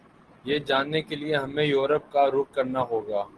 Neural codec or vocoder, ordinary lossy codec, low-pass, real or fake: none; Opus, 16 kbps; 10.8 kHz; real